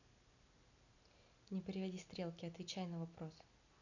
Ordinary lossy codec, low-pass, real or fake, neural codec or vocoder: none; 7.2 kHz; real; none